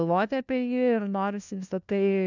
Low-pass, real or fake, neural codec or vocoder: 7.2 kHz; fake; codec, 16 kHz, 1 kbps, FunCodec, trained on LibriTTS, 50 frames a second